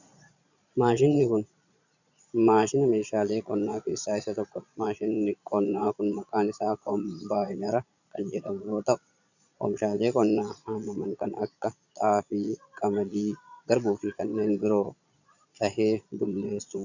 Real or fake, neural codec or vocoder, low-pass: fake; vocoder, 22.05 kHz, 80 mel bands, Vocos; 7.2 kHz